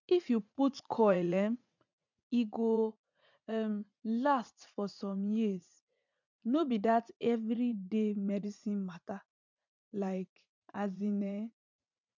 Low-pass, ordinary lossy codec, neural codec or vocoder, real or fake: 7.2 kHz; none; vocoder, 44.1 kHz, 80 mel bands, Vocos; fake